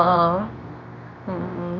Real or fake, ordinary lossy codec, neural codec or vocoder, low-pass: fake; none; codec, 24 kHz, 0.9 kbps, WavTokenizer, medium speech release version 2; 7.2 kHz